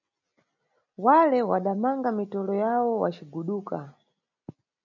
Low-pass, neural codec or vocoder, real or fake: 7.2 kHz; none; real